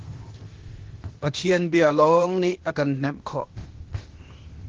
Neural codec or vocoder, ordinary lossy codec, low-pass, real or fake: codec, 16 kHz, 0.8 kbps, ZipCodec; Opus, 16 kbps; 7.2 kHz; fake